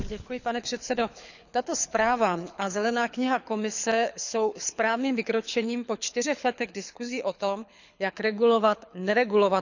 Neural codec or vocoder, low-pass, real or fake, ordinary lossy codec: codec, 24 kHz, 6 kbps, HILCodec; 7.2 kHz; fake; none